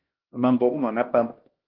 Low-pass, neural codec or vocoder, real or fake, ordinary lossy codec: 5.4 kHz; codec, 16 kHz, 1 kbps, X-Codec, HuBERT features, trained on LibriSpeech; fake; Opus, 16 kbps